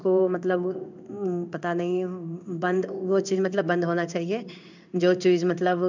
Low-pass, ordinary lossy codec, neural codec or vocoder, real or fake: 7.2 kHz; none; codec, 16 kHz in and 24 kHz out, 1 kbps, XY-Tokenizer; fake